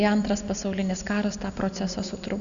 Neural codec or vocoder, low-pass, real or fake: none; 7.2 kHz; real